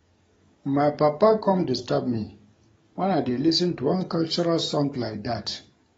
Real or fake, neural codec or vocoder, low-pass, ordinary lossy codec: fake; codec, 44.1 kHz, 7.8 kbps, DAC; 19.8 kHz; AAC, 24 kbps